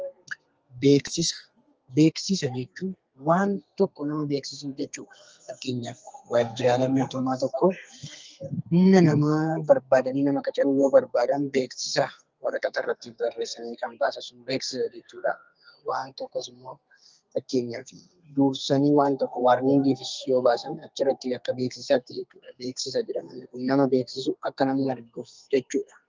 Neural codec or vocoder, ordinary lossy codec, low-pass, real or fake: codec, 32 kHz, 1.9 kbps, SNAC; Opus, 24 kbps; 7.2 kHz; fake